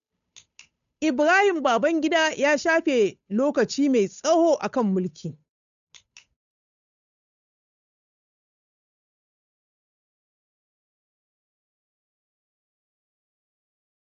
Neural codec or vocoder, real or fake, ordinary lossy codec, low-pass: codec, 16 kHz, 8 kbps, FunCodec, trained on Chinese and English, 25 frames a second; fake; none; 7.2 kHz